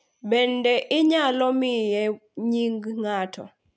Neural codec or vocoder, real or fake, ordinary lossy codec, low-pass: none; real; none; none